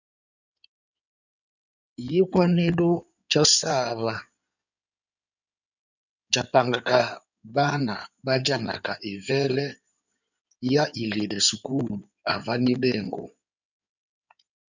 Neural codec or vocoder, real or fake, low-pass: codec, 16 kHz in and 24 kHz out, 2.2 kbps, FireRedTTS-2 codec; fake; 7.2 kHz